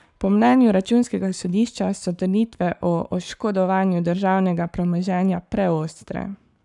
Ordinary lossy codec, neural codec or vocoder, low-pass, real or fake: none; codec, 44.1 kHz, 7.8 kbps, Pupu-Codec; 10.8 kHz; fake